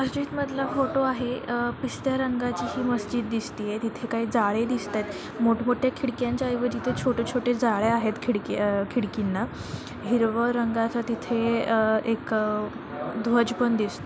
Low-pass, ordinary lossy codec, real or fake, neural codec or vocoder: none; none; real; none